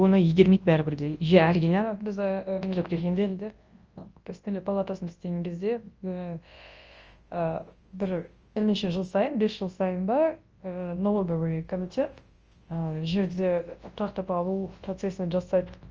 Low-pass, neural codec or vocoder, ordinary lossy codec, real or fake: 7.2 kHz; codec, 24 kHz, 0.9 kbps, WavTokenizer, large speech release; Opus, 24 kbps; fake